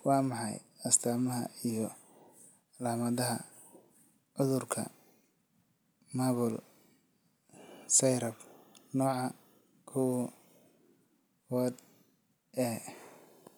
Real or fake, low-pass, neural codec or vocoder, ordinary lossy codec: real; none; none; none